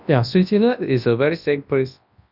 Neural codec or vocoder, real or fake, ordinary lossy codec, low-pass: codec, 24 kHz, 0.9 kbps, WavTokenizer, large speech release; fake; none; 5.4 kHz